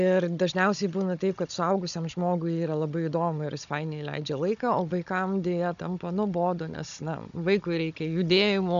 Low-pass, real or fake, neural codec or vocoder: 7.2 kHz; fake; codec, 16 kHz, 16 kbps, FunCodec, trained on Chinese and English, 50 frames a second